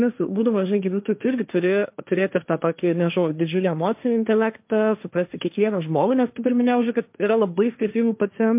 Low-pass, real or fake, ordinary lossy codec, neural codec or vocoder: 3.6 kHz; fake; MP3, 32 kbps; codec, 16 kHz, 1.1 kbps, Voila-Tokenizer